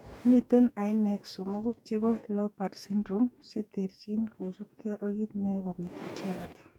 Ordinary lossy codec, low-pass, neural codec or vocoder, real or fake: none; 19.8 kHz; codec, 44.1 kHz, 2.6 kbps, DAC; fake